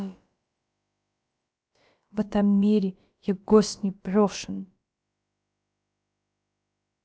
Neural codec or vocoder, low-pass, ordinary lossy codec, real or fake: codec, 16 kHz, about 1 kbps, DyCAST, with the encoder's durations; none; none; fake